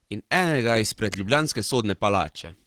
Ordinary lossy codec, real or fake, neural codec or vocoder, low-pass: Opus, 16 kbps; fake; codec, 44.1 kHz, 7.8 kbps, Pupu-Codec; 19.8 kHz